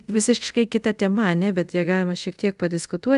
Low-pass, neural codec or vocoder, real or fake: 10.8 kHz; codec, 24 kHz, 0.5 kbps, DualCodec; fake